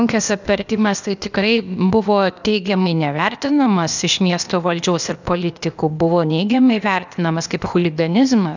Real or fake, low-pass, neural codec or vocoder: fake; 7.2 kHz; codec, 16 kHz, 0.8 kbps, ZipCodec